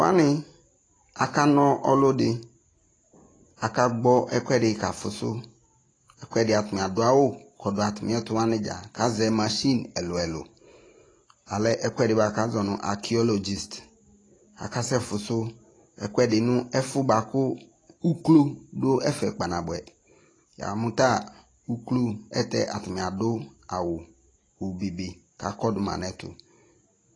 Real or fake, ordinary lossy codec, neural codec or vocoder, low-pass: real; AAC, 32 kbps; none; 9.9 kHz